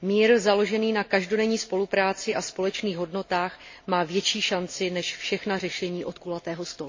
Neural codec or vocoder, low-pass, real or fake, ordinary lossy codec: none; 7.2 kHz; real; MP3, 32 kbps